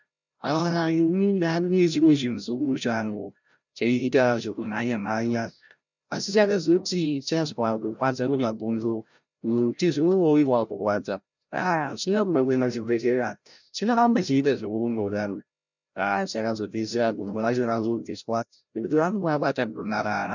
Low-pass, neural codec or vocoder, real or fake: 7.2 kHz; codec, 16 kHz, 0.5 kbps, FreqCodec, larger model; fake